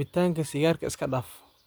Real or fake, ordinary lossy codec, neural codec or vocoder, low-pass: real; none; none; none